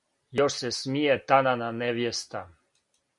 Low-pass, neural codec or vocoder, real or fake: 10.8 kHz; none; real